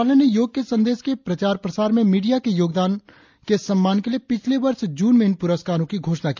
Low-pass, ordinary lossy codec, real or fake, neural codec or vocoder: 7.2 kHz; MP3, 64 kbps; real; none